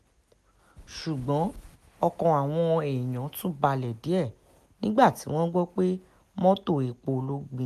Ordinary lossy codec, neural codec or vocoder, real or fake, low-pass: none; none; real; 14.4 kHz